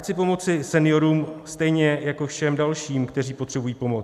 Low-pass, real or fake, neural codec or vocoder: 14.4 kHz; real; none